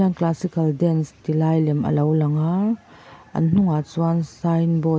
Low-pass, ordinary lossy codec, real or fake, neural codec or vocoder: none; none; real; none